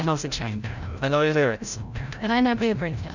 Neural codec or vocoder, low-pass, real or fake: codec, 16 kHz, 0.5 kbps, FreqCodec, larger model; 7.2 kHz; fake